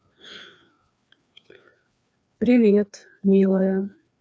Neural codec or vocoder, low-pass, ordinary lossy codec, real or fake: codec, 16 kHz, 2 kbps, FreqCodec, larger model; none; none; fake